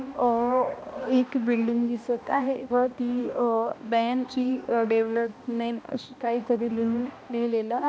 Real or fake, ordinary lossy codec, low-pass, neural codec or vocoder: fake; none; none; codec, 16 kHz, 1 kbps, X-Codec, HuBERT features, trained on balanced general audio